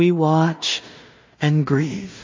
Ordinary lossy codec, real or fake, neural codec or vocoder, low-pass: MP3, 32 kbps; fake; codec, 16 kHz in and 24 kHz out, 0.4 kbps, LongCat-Audio-Codec, two codebook decoder; 7.2 kHz